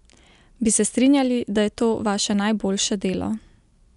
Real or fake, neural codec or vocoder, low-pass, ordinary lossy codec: real; none; 10.8 kHz; none